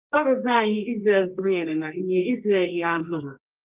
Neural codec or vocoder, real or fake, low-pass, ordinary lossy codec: codec, 24 kHz, 0.9 kbps, WavTokenizer, medium music audio release; fake; 3.6 kHz; Opus, 32 kbps